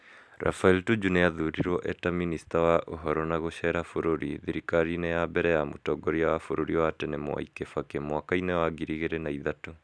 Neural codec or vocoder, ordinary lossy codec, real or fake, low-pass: none; none; real; none